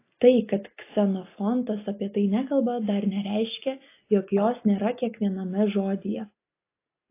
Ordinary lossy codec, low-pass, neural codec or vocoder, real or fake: AAC, 24 kbps; 3.6 kHz; none; real